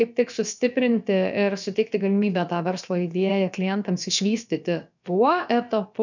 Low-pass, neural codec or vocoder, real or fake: 7.2 kHz; codec, 16 kHz, about 1 kbps, DyCAST, with the encoder's durations; fake